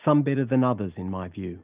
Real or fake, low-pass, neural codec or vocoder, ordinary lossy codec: real; 3.6 kHz; none; Opus, 32 kbps